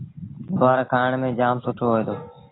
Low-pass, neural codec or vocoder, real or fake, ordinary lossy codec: 7.2 kHz; none; real; AAC, 16 kbps